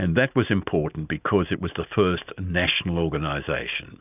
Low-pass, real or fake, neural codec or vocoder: 3.6 kHz; real; none